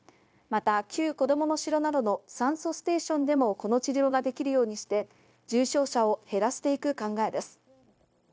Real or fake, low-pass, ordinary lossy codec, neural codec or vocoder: fake; none; none; codec, 16 kHz, 0.9 kbps, LongCat-Audio-Codec